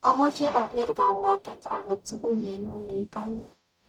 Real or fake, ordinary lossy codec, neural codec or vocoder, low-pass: fake; none; codec, 44.1 kHz, 0.9 kbps, DAC; 19.8 kHz